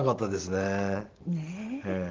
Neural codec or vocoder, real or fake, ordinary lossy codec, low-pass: none; real; Opus, 16 kbps; 7.2 kHz